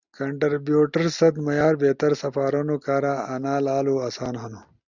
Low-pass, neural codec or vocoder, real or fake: 7.2 kHz; none; real